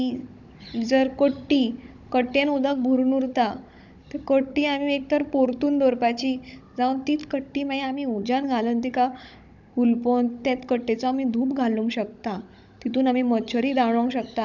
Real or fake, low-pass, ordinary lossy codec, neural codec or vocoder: fake; 7.2 kHz; none; codec, 16 kHz, 16 kbps, FunCodec, trained on Chinese and English, 50 frames a second